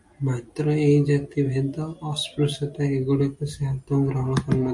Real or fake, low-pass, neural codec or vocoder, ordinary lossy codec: real; 10.8 kHz; none; MP3, 48 kbps